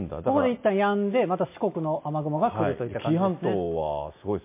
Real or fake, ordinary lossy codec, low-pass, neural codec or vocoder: real; MP3, 24 kbps; 3.6 kHz; none